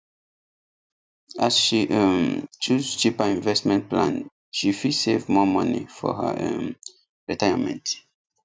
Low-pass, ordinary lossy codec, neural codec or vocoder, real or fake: none; none; none; real